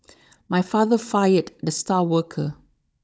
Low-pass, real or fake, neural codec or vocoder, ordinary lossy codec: none; fake; codec, 16 kHz, 16 kbps, FunCodec, trained on Chinese and English, 50 frames a second; none